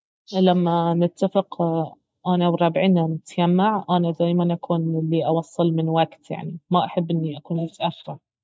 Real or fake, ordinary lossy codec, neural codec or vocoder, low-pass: real; none; none; none